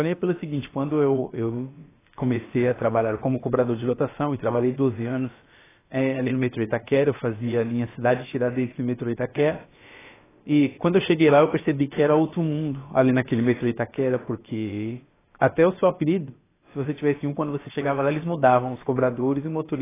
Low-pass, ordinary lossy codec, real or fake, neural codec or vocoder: 3.6 kHz; AAC, 16 kbps; fake; codec, 16 kHz, about 1 kbps, DyCAST, with the encoder's durations